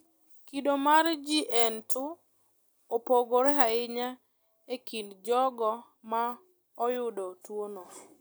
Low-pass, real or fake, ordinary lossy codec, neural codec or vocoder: none; real; none; none